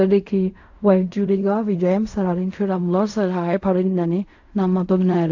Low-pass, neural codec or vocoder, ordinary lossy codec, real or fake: 7.2 kHz; codec, 16 kHz in and 24 kHz out, 0.4 kbps, LongCat-Audio-Codec, fine tuned four codebook decoder; AAC, 48 kbps; fake